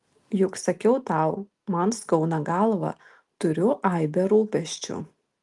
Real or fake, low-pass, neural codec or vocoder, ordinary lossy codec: real; 10.8 kHz; none; Opus, 32 kbps